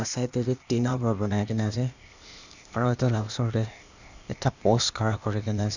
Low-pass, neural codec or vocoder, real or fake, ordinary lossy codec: 7.2 kHz; codec, 16 kHz, 0.8 kbps, ZipCodec; fake; none